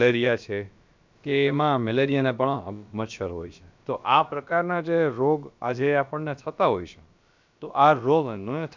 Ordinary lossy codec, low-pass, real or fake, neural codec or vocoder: MP3, 64 kbps; 7.2 kHz; fake; codec, 16 kHz, about 1 kbps, DyCAST, with the encoder's durations